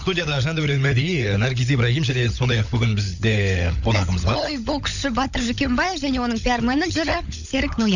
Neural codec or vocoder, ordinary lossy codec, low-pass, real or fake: codec, 16 kHz, 16 kbps, FunCodec, trained on LibriTTS, 50 frames a second; none; 7.2 kHz; fake